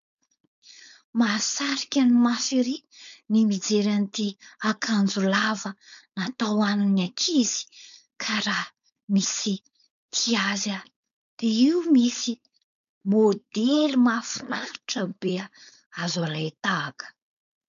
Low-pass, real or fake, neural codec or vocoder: 7.2 kHz; fake; codec, 16 kHz, 4.8 kbps, FACodec